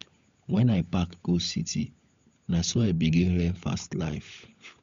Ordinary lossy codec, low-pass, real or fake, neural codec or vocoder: MP3, 64 kbps; 7.2 kHz; fake; codec, 16 kHz, 16 kbps, FunCodec, trained on LibriTTS, 50 frames a second